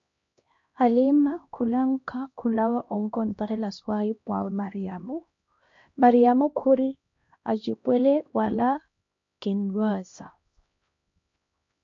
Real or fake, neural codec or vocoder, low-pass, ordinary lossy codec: fake; codec, 16 kHz, 1 kbps, X-Codec, HuBERT features, trained on LibriSpeech; 7.2 kHz; MP3, 48 kbps